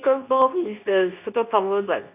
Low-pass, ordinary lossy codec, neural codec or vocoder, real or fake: 3.6 kHz; none; codec, 24 kHz, 0.9 kbps, WavTokenizer, medium speech release version 2; fake